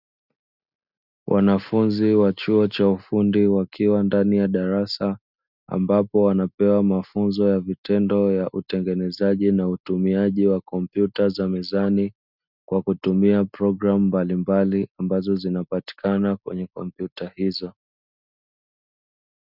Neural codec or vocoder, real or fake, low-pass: none; real; 5.4 kHz